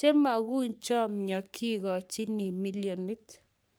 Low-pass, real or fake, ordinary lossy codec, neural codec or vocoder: none; fake; none; codec, 44.1 kHz, 3.4 kbps, Pupu-Codec